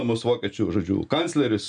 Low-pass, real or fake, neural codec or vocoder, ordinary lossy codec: 9.9 kHz; real; none; MP3, 96 kbps